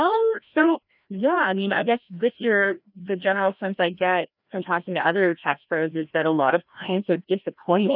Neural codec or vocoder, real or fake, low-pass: codec, 16 kHz, 1 kbps, FreqCodec, larger model; fake; 5.4 kHz